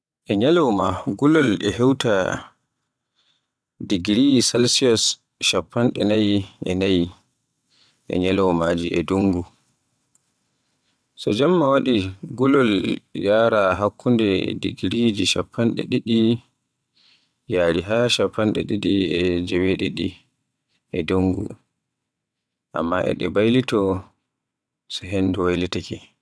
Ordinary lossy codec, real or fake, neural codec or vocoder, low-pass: none; fake; vocoder, 22.05 kHz, 80 mel bands, WaveNeXt; none